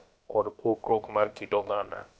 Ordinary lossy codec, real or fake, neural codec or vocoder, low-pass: none; fake; codec, 16 kHz, about 1 kbps, DyCAST, with the encoder's durations; none